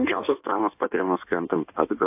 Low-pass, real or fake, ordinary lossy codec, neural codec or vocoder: 3.6 kHz; fake; AAC, 32 kbps; codec, 16 kHz in and 24 kHz out, 1.1 kbps, FireRedTTS-2 codec